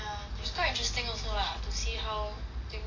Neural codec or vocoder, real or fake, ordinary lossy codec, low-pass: none; real; AAC, 32 kbps; 7.2 kHz